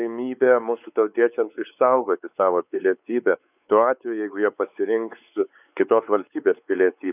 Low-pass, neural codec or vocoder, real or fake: 3.6 kHz; codec, 16 kHz, 4 kbps, X-Codec, WavLM features, trained on Multilingual LibriSpeech; fake